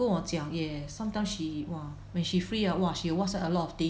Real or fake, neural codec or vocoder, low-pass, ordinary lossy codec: real; none; none; none